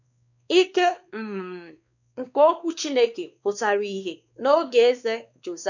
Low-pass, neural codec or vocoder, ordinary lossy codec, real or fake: 7.2 kHz; codec, 16 kHz, 2 kbps, X-Codec, WavLM features, trained on Multilingual LibriSpeech; none; fake